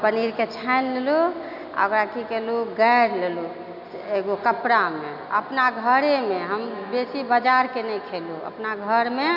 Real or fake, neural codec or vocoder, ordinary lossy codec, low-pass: real; none; none; 5.4 kHz